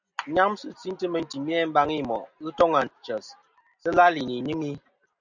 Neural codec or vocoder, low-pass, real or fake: none; 7.2 kHz; real